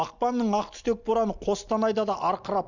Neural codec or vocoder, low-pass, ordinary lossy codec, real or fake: none; 7.2 kHz; none; real